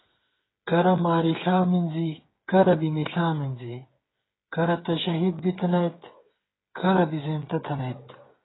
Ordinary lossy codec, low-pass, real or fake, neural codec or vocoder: AAC, 16 kbps; 7.2 kHz; fake; codec, 16 kHz, 8 kbps, FreqCodec, smaller model